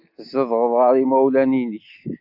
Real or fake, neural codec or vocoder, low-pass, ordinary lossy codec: real; none; 5.4 kHz; AAC, 48 kbps